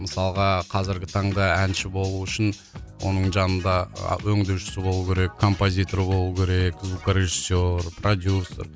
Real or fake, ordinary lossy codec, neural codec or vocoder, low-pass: real; none; none; none